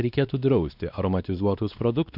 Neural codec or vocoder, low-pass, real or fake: codec, 16 kHz, 2 kbps, X-Codec, WavLM features, trained on Multilingual LibriSpeech; 5.4 kHz; fake